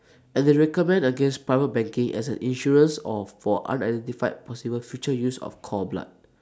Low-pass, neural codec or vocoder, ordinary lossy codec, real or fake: none; none; none; real